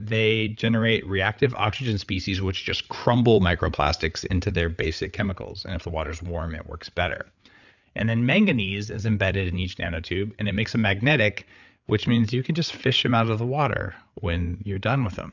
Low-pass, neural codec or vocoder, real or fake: 7.2 kHz; codec, 16 kHz, 8 kbps, FreqCodec, larger model; fake